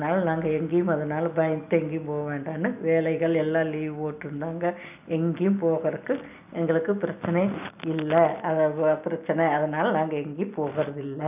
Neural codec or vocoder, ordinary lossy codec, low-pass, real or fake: none; none; 3.6 kHz; real